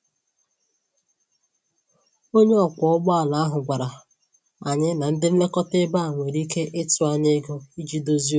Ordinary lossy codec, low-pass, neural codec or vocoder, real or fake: none; none; none; real